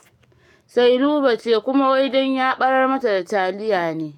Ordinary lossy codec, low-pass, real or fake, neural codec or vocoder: none; 19.8 kHz; fake; codec, 44.1 kHz, 7.8 kbps, DAC